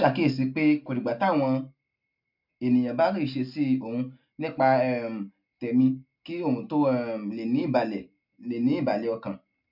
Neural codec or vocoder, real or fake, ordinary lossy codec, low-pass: none; real; MP3, 48 kbps; 5.4 kHz